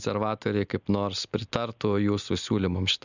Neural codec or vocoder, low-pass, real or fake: none; 7.2 kHz; real